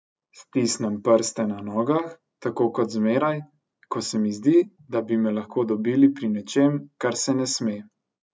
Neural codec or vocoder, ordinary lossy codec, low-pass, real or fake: none; none; none; real